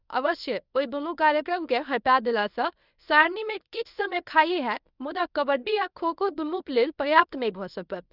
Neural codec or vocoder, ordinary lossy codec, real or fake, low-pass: codec, 24 kHz, 0.9 kbps, WavTokenizer, medium speech release version 1; none; fake; 5.4 kHz